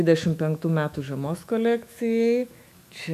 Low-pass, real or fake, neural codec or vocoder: 14.4 kHz; fake; autoencoder, 48 kHz, 128 numbers a frame, DAC-VAE, trained on Japanese speech